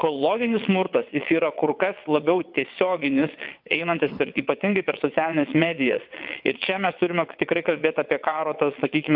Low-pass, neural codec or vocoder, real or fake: 5.4 kHz; vocoder, 22.05 kHz, 80 mel bands, WaveNeXt; fake